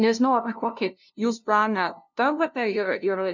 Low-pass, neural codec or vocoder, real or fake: 7.2 kHz; codec, 16 kHz, 0.5 kbps, FunCodec, trained on LibriTTS, 25 frames a second; fake